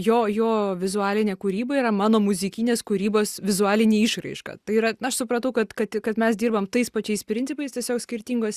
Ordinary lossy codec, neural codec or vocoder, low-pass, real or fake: Opus, 64 kbps; none; 14.4 kHz; real